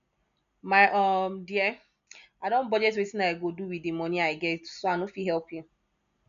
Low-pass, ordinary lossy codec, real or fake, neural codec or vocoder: 7.2 kHz; none; real; none